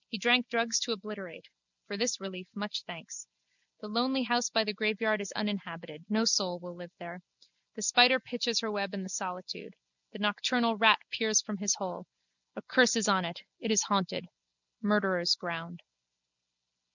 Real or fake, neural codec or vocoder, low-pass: real; none; 7.2 kHz